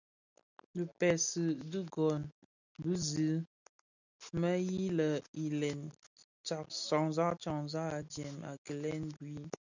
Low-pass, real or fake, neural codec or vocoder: 7.2 kHz; real; none